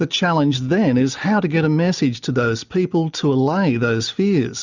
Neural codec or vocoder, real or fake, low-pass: none; real; 7.2 kHz